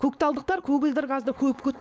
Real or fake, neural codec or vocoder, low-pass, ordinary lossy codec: fake; codec, 16 kHz, 4.8 kbps, FACodec; none; none